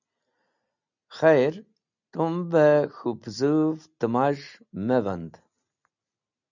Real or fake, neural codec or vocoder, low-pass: real; none; 7.2 kHz